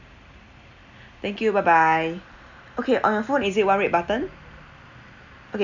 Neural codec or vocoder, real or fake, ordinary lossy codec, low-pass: none; real; none; 7.2 kHz